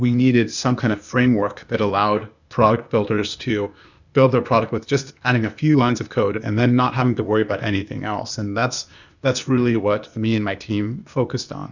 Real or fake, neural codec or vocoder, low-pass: fake; codec, 16 kHz, 0.8 kbps, ZipCodec; 7.2 kHz